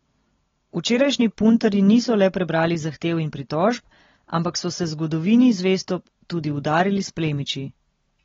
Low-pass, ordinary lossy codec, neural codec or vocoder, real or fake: 7.2 kHz; AAC, 24 kbps; none; real